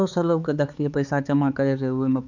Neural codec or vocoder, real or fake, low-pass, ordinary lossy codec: codec, 16 kHz, 4 kbps, X-Codec, HuBERT features, trained on balanced general audio; fake; 7.2 kHz; none